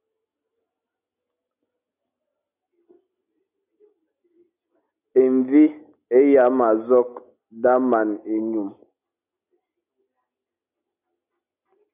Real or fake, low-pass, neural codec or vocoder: real; 3.6 kHz; none